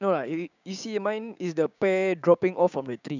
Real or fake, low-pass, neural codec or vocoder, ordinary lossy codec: fake; 7.2 kHz; codec, 16 kHz, 6 kbps, DAC; none